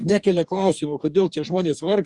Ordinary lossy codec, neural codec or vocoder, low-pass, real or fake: Opus, 64 kbps; codec, 44.1 kHz, 2.6 kbps, DAC; 10.8 kHz; fake